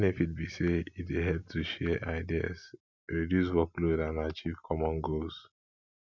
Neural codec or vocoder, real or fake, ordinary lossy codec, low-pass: none; real; none; 7.2 kHz